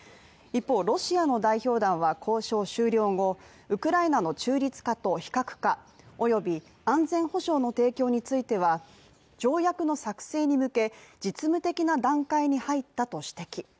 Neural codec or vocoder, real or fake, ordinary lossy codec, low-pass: none; real; none; none